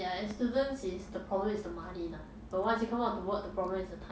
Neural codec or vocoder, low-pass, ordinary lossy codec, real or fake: none; none; none; real